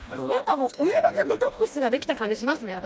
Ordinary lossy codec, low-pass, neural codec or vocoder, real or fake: none; none; codec, 16 kHz, 1 kbps, FreqCodec, smaller model; fake